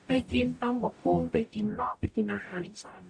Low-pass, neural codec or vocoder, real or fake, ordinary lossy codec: 9.9 kHz; codec, 44.1 kHz, 0.9 kbps, DAC; fake; none